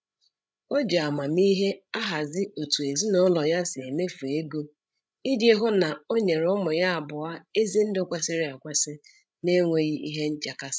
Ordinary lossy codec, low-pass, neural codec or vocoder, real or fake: none; none; codec, 16 kHz, 16 kbps, FreqCodec, larger model; fake